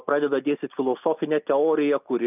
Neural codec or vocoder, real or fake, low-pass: none; real; 3.6 kHz